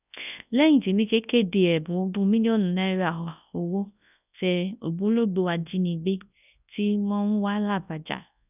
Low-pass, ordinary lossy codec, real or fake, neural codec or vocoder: 3.6 kHz; none; fake; codec, 24 kHz, 0.9 kbps, WavTokenizer, large speech release